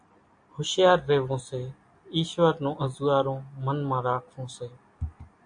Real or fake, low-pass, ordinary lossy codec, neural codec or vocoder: real; 9.9 kHz; AAC, 64 kbps; none